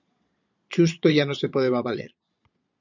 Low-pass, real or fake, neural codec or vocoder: 7.2 kHz; fake; vocoder, 22.05 kHz, 80 mel bands, Vocos